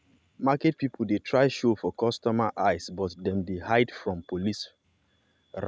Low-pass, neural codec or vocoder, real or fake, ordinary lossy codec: none; none; real; none